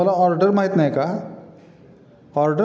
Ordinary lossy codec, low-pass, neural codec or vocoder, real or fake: none; none; none; real